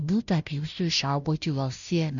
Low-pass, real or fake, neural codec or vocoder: 7.2 kHz; fake; codec, 16 kHz, 0.5 kbps, FunCodec, trained on Chinese and English, 25 frames a second